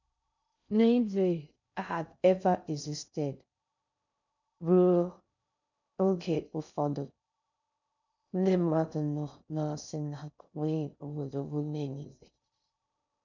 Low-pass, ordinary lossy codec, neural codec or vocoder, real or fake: 7.2 kHz; none; codec, 16 kHz in and 24 kHz out, 0.6 kbps, FocalCodec, streaming, 2048 codes; fake